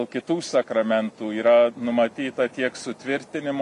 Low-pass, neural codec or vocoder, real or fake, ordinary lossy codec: 14.4 kHz; none; real; MP3, 48 kbps